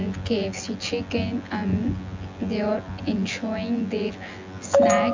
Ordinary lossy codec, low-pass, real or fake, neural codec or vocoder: MP3, 48 kbps; 7.2 kHz; fake; vocoder, 24 kHz, 100 mel bands, Vocos